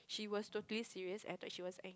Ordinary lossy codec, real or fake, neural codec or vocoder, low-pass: none; real; none; none